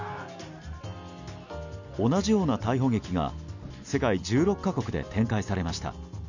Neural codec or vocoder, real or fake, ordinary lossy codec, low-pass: none; real; none; 7.2 kHz